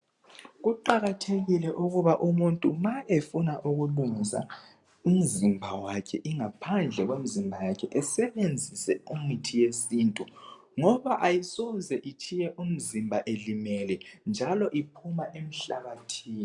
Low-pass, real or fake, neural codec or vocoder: 10.8 kHz; real; none